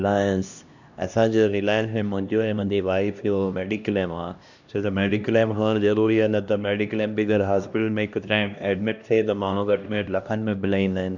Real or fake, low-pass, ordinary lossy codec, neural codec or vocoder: fake; 7.2 kHz; none; codec, 16 kHz, 1 kbps, X-Codec, HuBERT features, trained on LibriSpeech